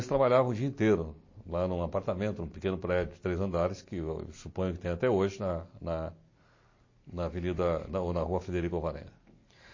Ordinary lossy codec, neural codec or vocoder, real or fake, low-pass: MP3, 32 kbps; none; real; 7.2 kHz